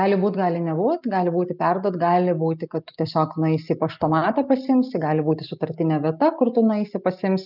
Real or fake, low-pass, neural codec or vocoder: real; 5.4 kHz; none